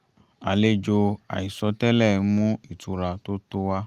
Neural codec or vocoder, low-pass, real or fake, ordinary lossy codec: none; 14.4 kHz; real; Opus, 32 kbps